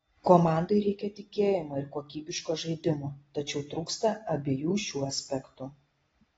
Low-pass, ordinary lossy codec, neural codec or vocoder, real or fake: 19.8 kHz; AAC, 24 kbps; none; real